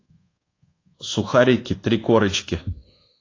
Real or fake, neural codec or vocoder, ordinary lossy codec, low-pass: fake; codec, 24 kHz, 1.2 kbps, DualCodec; AAC, 32 kbps; 7.2 kHz